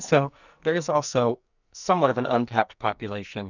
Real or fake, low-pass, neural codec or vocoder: fake; 7.2 kHz; codec, 44.1 kHz, 2.6 kbps, SNAC